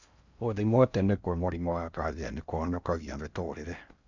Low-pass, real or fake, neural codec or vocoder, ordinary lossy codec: 7.2 kHz; fake; codec, 16 kHz in and 24 kHz out, 0.6 kbps, FocalCodec, streaming, 4096 codes; Opus, 64 kbps